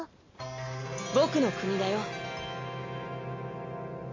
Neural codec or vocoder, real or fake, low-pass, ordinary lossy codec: none; real; 7.2 kHz; MP3, 32 kbps